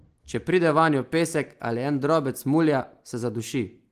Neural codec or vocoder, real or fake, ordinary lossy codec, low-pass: none; real; Opus, 24 kbps; 14.4 kHz